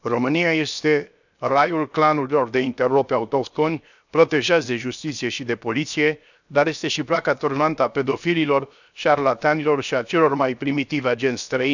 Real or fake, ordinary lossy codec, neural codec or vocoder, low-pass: fake; none; codec, 16 kHz, 0.7 kbps, FocalCodec; 7.2 kHz